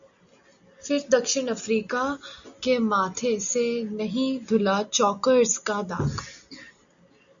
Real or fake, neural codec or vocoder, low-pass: real; none; 7.2 kHz